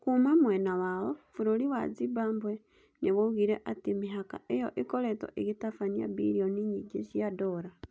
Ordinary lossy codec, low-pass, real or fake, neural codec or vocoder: none; none; real; none